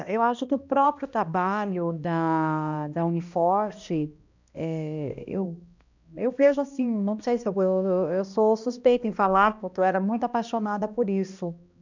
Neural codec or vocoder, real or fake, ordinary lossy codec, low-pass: codec, 16 kHz, 1 kbps, X-Codec, HuBERT features, trained on balanced general audio; fake; none; 7.2 kHz